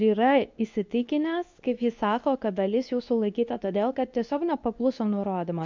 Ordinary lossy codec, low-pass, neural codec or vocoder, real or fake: MP3, 64 kbps; 7.2 kHz; codec, 24 kHz, 0.9 kbps, WavTokenizer, medium speech release version 2; fake